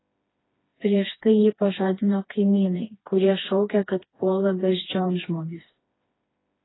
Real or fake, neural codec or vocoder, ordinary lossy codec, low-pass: fake; codec, 16 kHz, 2 kbps, FreqCodec, smaller model; AAC, 16 kbps; 7.2 kHz